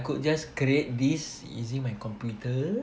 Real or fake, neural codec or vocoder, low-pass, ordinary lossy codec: real; none; none; none